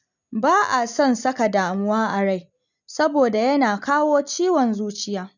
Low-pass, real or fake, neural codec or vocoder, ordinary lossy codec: 7.2 kHz; real; none; none